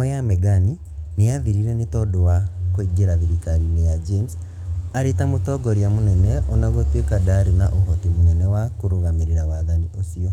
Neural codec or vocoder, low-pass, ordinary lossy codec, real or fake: codec, 44.1 kHz, 7.8 kbps, DAC; 19.8 kHz; none; fake